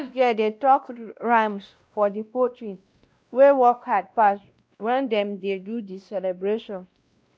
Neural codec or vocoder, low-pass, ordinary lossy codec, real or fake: codec, 16 kHz, 1 kbps, X-Codec, WavLM features, trained on Multilingual LibriSpeech; none; none; fake